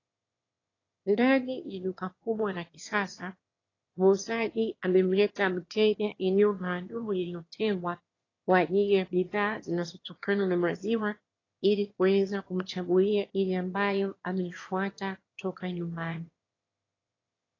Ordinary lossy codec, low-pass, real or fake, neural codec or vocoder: AAC, 32 kbps; 7.2 kHz; fake; autoencoder, 22.05 kHz, a latent of 192 numbers a frame, VITS, trained on one speaker